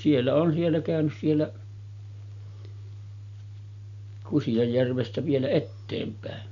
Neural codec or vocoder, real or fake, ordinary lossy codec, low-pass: none; real; none; 7.2 kHz